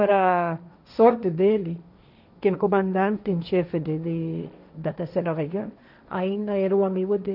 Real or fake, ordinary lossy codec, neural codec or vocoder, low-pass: fake; none; codec, 16 kHz, 1.1 kbps, Voila-Tokenizer; 5.4 kHz